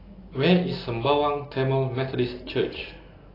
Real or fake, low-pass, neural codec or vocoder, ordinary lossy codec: real; 5.4 kHz; none; AAC, 24 kbps